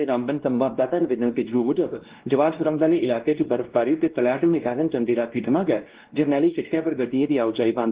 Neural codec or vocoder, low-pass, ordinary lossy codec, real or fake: codec, 16 kHz, 1 kbps, X-Codec, WavLM features, trained on Multilingual LibriSpeech; 3.6 kHz; Opus, 16 kbps; fake